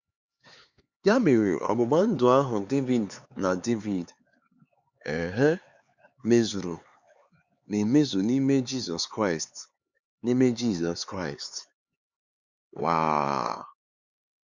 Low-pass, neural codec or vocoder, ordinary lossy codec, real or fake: 7.2 kHz; codec, 16 kHz, 4 kbps, X-Codec, HuBERT features, trained on LibriSpeech; Opus, 64 kbps; fake